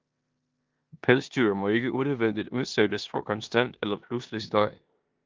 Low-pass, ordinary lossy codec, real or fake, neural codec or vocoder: 7.2 kHz; Opus, 32 kbps; fake; codec, 16 kHz in and 24 kHz out, 0.9 kbps, LongCat-Audio-Codec, four codebook decoder